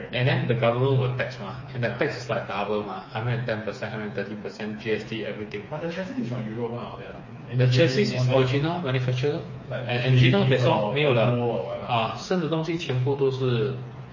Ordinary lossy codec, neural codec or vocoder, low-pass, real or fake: MP3, 32 kbps; codec, 16 kHz, 4 kbps, FreqCodec, smaller model; 7.2 kHz; fake